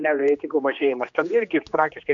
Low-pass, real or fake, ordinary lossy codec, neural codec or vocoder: 7.2 kHz; fake; MP3, 96 kbps; codec, 16 kHz, 2 kbps, X-Codec, HuBERT features, trained on general audio